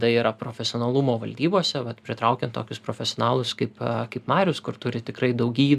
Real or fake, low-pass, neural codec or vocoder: real; 14.4 kHz; none